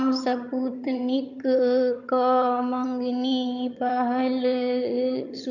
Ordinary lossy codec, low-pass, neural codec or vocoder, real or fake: none; 7.2 kHz; vocoder, 22.05 kHz, 80 mel bands, HiFi-GAN; fake